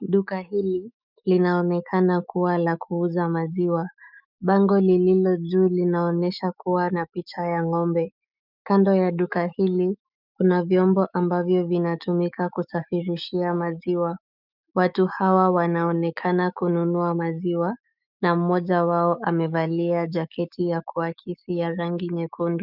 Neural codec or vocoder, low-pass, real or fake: autoencoder, 48 kHz, 128 numbers a frame, DAC-VAE, trained on Japanese speech; 5.4 kHz; fake